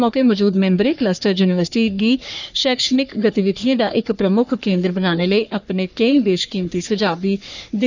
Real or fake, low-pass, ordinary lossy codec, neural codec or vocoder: fake; 7.2 kHz; none; codec, 44.1 kHz, 3.4 kbps, Pupu-Codec